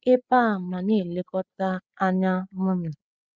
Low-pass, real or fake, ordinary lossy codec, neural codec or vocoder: none; fake; none; codec, 16 kHz, 8 kbps, FreqCodec, larger model